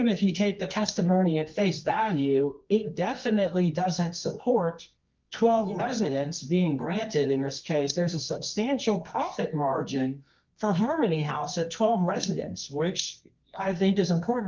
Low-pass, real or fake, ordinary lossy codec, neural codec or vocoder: 7.2 kHz; fake; Opus, 24 kbps; codec, 24 kHz, 0.9 kbps, WavTokenizer, medium music audio release